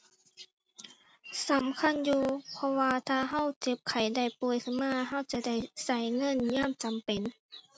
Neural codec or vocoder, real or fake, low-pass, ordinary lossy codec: none; real; none; none